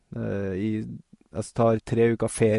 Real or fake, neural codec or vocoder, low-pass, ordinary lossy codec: real; none; 14.4 kHz; MP3, 48 kbps